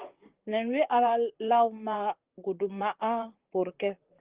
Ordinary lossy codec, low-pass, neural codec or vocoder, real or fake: Opus, 16 kbps; 3.6 kHz; vocoder, 44.1 kHz, 128 mel bands, Pupu-Vocoder; fake